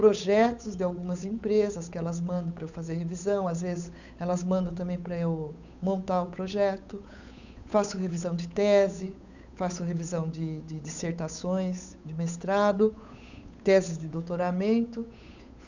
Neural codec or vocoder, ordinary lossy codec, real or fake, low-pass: codec, 16 kHz, 8 kbps, FunCodec, trained on Chinese and English, 25 frames a second; none; fake; 7.2 kHz